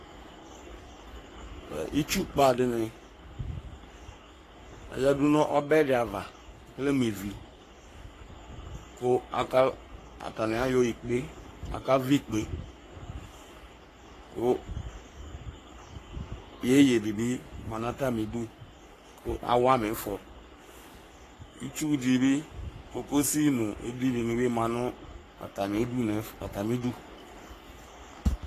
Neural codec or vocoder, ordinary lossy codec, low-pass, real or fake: codec, 44.1 kHz, 3.4 kbps, Pupu-Codec; AAC, 48 kbps; 14.4 kHz; fake